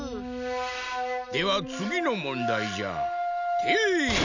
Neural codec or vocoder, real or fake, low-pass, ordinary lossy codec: none; real; 7.2 kHz; none